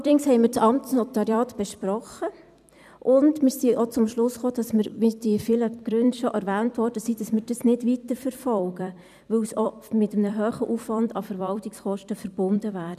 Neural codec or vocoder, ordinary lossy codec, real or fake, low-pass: vocoder, 44.1 kHz, 128 mel bands every 256 samples, BigVGAN v2; none; fake; 14.4 kHz